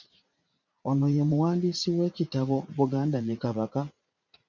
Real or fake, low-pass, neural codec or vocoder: fake; 7.2 kHz; vocoder, 44.1 kHz, 80 mel bands, Vocos